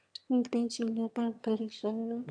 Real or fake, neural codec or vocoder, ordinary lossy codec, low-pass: fake; autoencoder, 22.05 kHz, a latent of 192 numbers a frame, VITS, trained on one speaker; AAC, 64 kbps; 9.9 kHz